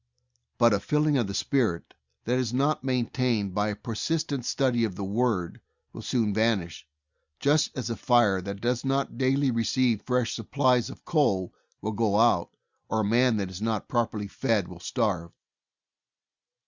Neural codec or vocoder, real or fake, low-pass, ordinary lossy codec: none; real; 7.2 kHz; Opus, 64 kbps